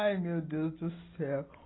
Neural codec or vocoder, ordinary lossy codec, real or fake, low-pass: none; AAC, 16 kbps; real; 7.2 kHz